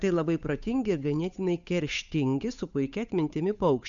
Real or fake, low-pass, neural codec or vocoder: fake; 7.2 kHz; codec, 16 kHz, 8 kbps, FunCodec, trained on Chinese and English, 25 frames a second